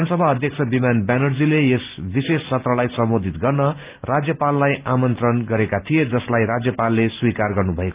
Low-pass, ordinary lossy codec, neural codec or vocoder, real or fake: 3.6 kHz; Opus, 32 kbps; none; real